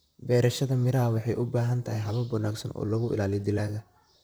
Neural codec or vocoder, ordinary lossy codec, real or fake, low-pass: vocoder, 44.1 kHz, 128 mel bands, Pupu-Vocoder; none; fake; none